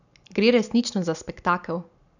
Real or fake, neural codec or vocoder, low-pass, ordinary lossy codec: real; none; 7.2 kHz; none